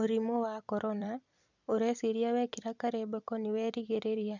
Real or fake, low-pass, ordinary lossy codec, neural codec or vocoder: fake; 7.2 kHz; none; vocoder, 44.1 kHz, 128 mel bands every 256 samples, BigVGAN v2